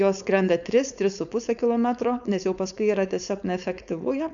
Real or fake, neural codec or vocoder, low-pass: fake; codec, 16 kHz, 4.8 kbps, FACodec; 7.2 kHz